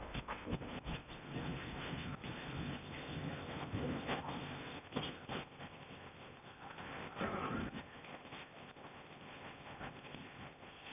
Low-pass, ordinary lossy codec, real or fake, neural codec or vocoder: 3.6 kHz; none; fake; codec, 16 kHz in and 24 kHz out, 0.8 kbps, FocalCodec, streaming, 65536 codes